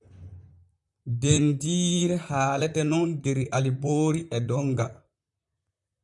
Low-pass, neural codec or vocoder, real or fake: 10.8 kHz; vocoder, 44.1 kHz, 128 mel bands, Pupu-Vocoder; fake